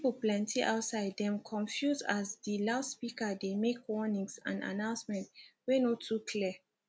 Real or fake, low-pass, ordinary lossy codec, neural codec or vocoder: real; none; none; none